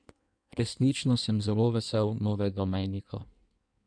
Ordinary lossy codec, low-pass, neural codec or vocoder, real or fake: none; 9.9 kHz; codec, 16 kHz in and 24 kHz out, 1.1 kbps, FireRedTTS-2 codec; fake